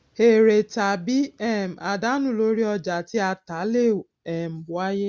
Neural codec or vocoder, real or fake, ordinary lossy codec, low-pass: none; real; none; none